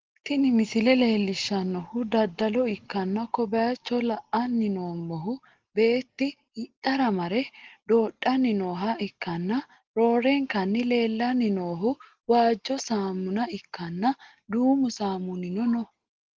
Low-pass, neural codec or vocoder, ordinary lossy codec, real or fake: 7.2 kHz; none; Opus, 16 kbps; real